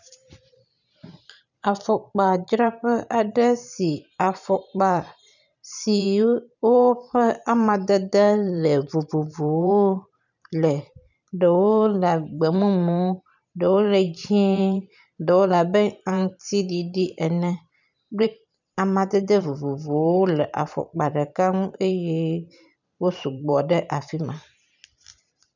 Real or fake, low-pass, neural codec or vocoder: fake; 7.2 kHz; vocoder, 44.1 kHz, 128 mel bands every 512 samples, BigVGAN v2